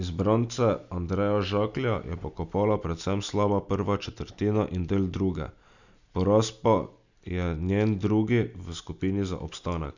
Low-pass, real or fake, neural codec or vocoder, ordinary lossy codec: 7.2 kHz; real; none; none